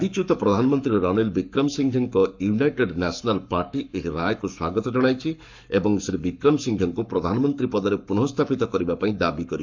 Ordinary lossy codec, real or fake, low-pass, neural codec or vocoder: MP3, 64 kbps; fake; 7.2 kHz; codec, 44.1 kHz, 7.8 kbps, Pupu-Codec